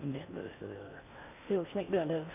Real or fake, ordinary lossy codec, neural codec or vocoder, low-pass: fake; none; codec, 16 kHz in and 24 kHz out, 0.6 kbps, FocalCodec, streaming, 4096 codes; 3.6 kHz